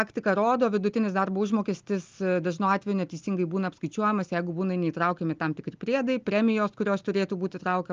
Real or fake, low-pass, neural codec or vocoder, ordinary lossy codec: real; 7.2 kHz; none; Opus, 24 kbps